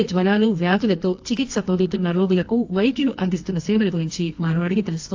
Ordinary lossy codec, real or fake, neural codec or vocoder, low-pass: AAC, 48 kbps; fake; codec, 24 kHz, 0.9 kbps, WavTokenizer, medium music audio release; 7.2 kHz